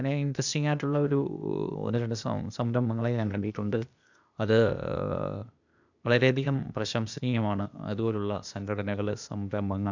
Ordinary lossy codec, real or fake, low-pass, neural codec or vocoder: none; fake; 7.2 kHz; codec, 16 kHz, 0.8 kbps, ZipCodec